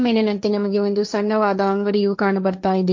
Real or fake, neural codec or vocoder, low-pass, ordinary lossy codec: fake; codec, 16 kHz, 1.1 kbps, Voila-Tokenizer; 7.2 kHz; MP3, 48 kbps